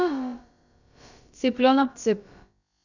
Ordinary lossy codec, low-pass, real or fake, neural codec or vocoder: none; 7.2 kHz; fake; codec, 16 kHz, about 1 kbps, DyCAST, with the encoder's durations